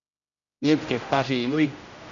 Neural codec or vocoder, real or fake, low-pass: codec, 16 kHz, 0.5 kbps, X-Codec, HuBERT features, trained on general audio; fake; 7.2 kHz